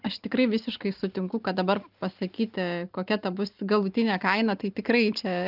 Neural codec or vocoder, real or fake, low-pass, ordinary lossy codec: none; real; 5.4 kHz; Opus, 24 kbps